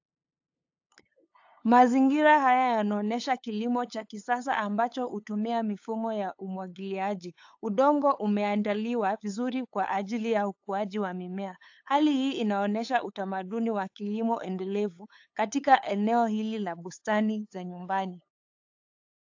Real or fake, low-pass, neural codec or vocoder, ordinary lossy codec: fake; 7.2 kHz; codec, 16 kHz, 8 kbps, FunCodec, trained on LibriTTS, 25 frames a second; AAC, 48 kbps